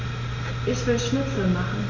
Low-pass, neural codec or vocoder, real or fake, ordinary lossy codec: 7.2 kHz; none; real; none